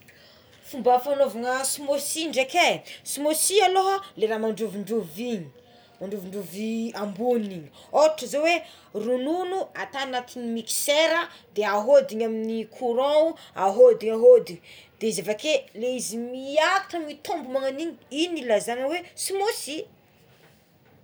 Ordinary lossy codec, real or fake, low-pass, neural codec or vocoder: none; real; none; none